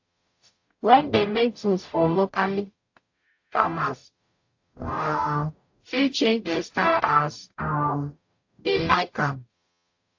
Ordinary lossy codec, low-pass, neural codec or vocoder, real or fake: AAC, 48 kbps; 7.2 kHz; codec, 44.1 kHz, 0.9 kbps, DAC; fake